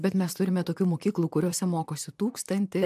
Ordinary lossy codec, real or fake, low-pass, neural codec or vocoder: AAC, 96 kbps; fake; 14.4 kHz; vocoder, 44.1 kHz, 128 mel bands, Pupu-Vocoder